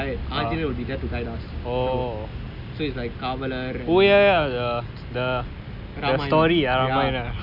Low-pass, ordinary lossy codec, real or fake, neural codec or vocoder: 5.4 kHz; none; real; none